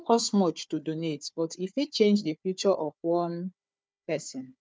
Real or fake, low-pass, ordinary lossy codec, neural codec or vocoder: fake; none; none; codec, 16 kHz, 4 kbps, FunCodec, trained on Chinese and English, 50 frames a second